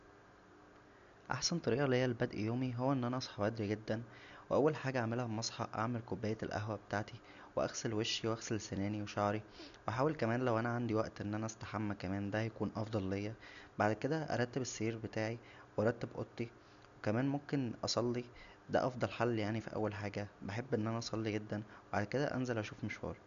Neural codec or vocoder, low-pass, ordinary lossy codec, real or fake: none; 7.2 kHz; none; real